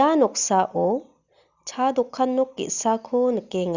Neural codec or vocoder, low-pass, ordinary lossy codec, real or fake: none; 7.2 kHz; Opus, 64 kbps; real